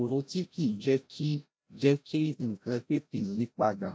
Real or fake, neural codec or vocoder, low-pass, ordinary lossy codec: fake; codec, 16 kHz, 0.5 kbps, FreqCodec, larger model; none; none